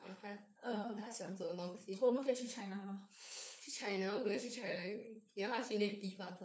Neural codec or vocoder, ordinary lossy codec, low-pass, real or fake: codec, 16 kHz, 4 kbps, FunCodec, trained on LibriTTS, 50 frames a second; none; none; fake